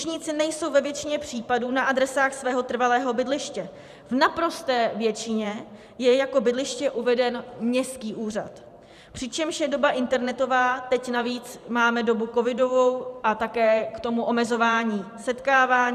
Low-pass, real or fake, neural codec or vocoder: 14.4 kHz; fake; vocoder, 44.1 kHz, 128 mel bands every 512 samples, BigVGAN v2